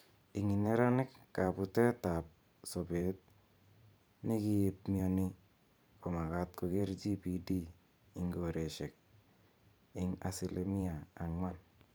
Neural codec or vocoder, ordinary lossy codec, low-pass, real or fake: vocoder, 44.1 kHz, 128 mel bands every 512 samples, BigVGAN v2; none; none; fake